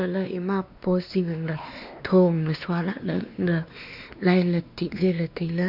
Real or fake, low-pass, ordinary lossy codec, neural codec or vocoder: fake; 5.4 kHz; none; codec, 16 kHz, 2 kbps, X-Codec, WavLM features, trained on Multilingual LibriSpeech